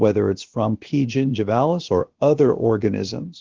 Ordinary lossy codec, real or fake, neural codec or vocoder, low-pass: Opus, 16 kbps; fake; codec, 16 kHz, about 1 kbps, DyCAST, with the encoder's durations; 7.2 kHz